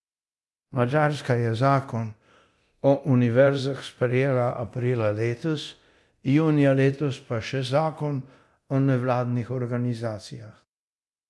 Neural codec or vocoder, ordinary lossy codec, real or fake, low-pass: codec, 24 kHz, 0.9 kbps, DualCodec; none; fake; none